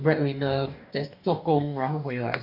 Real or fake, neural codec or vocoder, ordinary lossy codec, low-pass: fake; codec, 44.1 kHz, 2.6 kbps, DAC; none; 5.4 kHz